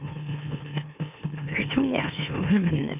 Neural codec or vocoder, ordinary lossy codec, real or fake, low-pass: autoencoder, 44.1 kHz, a latent of 192 numbers a frame, MeloTTS; none; fake; 3.6 kHz